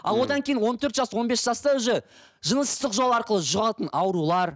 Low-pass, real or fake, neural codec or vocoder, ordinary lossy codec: none; real; none; none